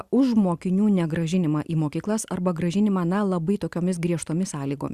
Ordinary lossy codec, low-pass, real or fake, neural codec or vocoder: Opus, 64 kbps; 14.4 kHz; real; none